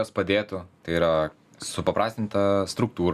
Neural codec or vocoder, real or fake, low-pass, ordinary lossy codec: none; real; 14.4 kHz; Opus, 64 kbps